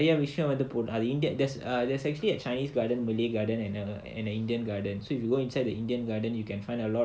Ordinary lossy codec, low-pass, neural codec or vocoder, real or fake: none; none; none; real